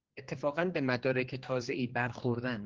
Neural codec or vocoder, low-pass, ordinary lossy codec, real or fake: codec, 16 kHz, 2 kbps, X-Codec, HuBERT features, trained on general audio; 7.2 kHz; Opus, 16 kbps; fake